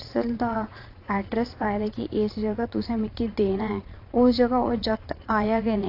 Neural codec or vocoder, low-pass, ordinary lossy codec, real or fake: vocoder, 22.05 kHz, 80 mel bands, Vocos; 5.4 kHz; AAC, 32 kbps; fake